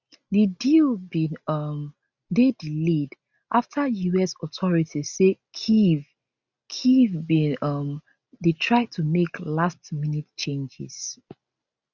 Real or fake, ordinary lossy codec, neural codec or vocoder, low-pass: real; none; none; 7.2 kHz